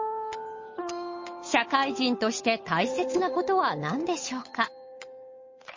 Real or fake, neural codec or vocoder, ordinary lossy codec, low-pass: fake; codec, 16 kHz, 8 kbps, FunCodec, trained on Chinese and English, 25 frames a second; MP3, 32 kbps; 7.2 kHz